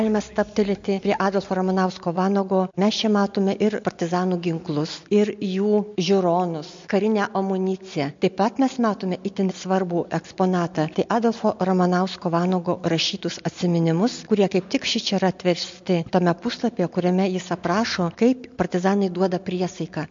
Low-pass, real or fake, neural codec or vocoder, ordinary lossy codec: 7.2 kHz; real; none; MP3, 48 kbps